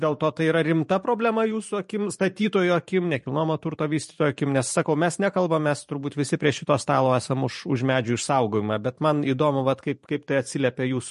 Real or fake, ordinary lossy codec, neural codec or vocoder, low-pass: fake; MP3, 48 kbps; vocoder, 44.1 kHz, 128 mel bands every 512 samples, BigVGAN v2; 14.4 kHz